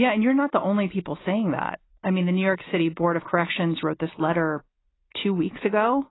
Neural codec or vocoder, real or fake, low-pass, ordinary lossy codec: none; real; 7.2 kHz; AAC, 16 kbps